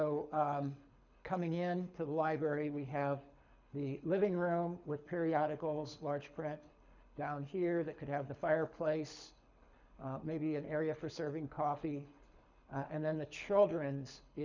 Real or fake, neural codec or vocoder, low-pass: fake; codec, 24 kHz, 6 kbps, HILCodec; 7.2 kHz